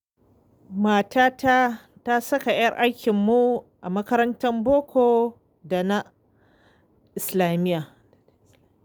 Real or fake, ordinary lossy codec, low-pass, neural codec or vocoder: real; none; none; none